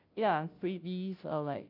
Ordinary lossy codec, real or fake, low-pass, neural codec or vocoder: AAC, 48 kbps; fake; 5.4 kHz; codec, 16 kHz, 0.5 kbps, FunCodec, trained on Chinese and English, 25 frames a second